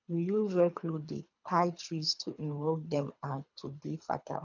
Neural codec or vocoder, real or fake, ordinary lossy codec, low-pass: codec, 24 kHz, 3 kbps, HILCodec; fake; none; 7.2 kHz